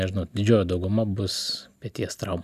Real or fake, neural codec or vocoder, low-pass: real; none; 14.4 kHz